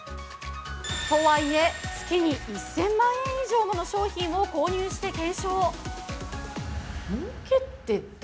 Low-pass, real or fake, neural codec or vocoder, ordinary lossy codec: none; real; none; none